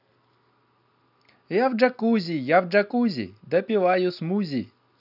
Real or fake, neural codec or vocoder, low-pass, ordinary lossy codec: real; none; 5.4 kHz; none